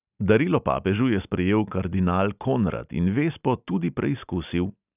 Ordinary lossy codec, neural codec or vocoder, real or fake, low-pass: none; none; real; 3.6 kHz